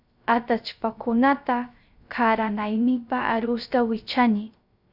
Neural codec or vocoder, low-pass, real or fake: codec, 16 kHz, 0.3 kbps, FocalCodec; 5.4 kHz; fake